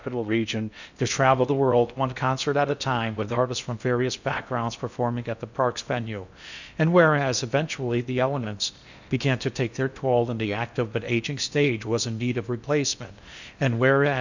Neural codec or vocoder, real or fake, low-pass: codec, 16 kHz in and 24 kHz out, 0.6 kbps, FocalCodec, streaming, 4096 codes; fake; 7.2 kHz